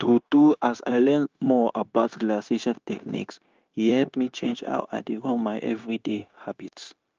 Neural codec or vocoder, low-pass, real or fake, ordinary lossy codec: codec, 16 kHz, 0.9 kbps, LongCat-Audio-Codec; 7.2 kHz; fake; Opus, 24 kbps